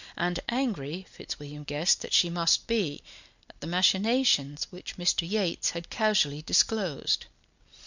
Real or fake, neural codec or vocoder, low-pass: real; none; 7.2 kHz